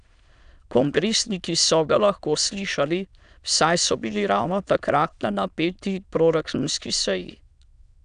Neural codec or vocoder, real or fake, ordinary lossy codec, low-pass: autoencoder, 22.05 kHz, a latent of 192 numbers a frame, VITS, trained on many speakers; fake; none; 9.9 kHz